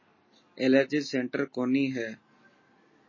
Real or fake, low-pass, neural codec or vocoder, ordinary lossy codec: real; 7.2 kHz; none; MP3, 32 kbps